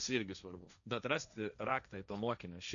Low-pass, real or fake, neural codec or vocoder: 7.2 kHz; fake; codec, 16 kHz, 1.1 kbps, Voila-Tokenizer